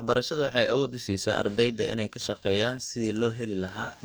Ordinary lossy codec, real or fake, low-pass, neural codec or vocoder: none; fake; none; codec, 44.1 kHz, 2.6 kbps, DAC